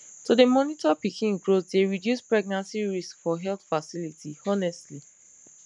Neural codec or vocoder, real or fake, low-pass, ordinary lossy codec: none; real; 10.8 kHz; none